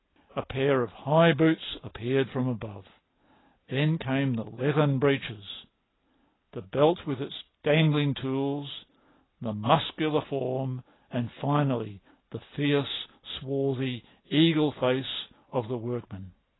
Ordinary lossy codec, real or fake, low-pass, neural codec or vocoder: AAC, 16 kbps; real; 7.2 kHz; none